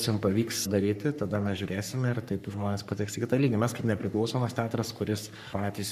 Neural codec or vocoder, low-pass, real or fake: codec, 44.1 kHz, 3.4 kbps, Pupu-Codec; 14.4 kHz; fake